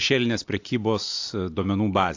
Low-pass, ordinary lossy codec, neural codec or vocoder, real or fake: 7.2 kHz; AAC, 48 kbps; none; real